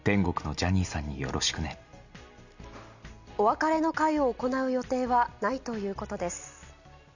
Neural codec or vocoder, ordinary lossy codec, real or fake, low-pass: none; none; real; 7.2 kHz